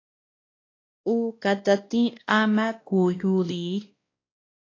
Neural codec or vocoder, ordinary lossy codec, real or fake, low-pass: codec, 16 kHz, 1 kbps, X-Codec, WavLM features, trained on Multilingual LibriSpeech; AAC, 32 kbps; fake; 7.2 kHz